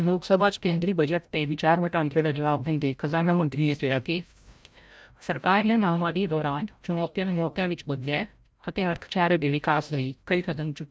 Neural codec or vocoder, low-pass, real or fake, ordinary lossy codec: codec, 16 kHz, 0.5 kbps, FreqCodec, larger model; none; fake; none